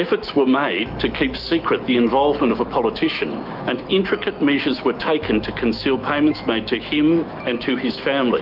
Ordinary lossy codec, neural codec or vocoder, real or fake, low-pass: Opus, 24 kbps; autoencoder, 48 kHz, 128 numbers a frame, DAC-VAE, trained on Japanese speech; fake; 5.4 kHz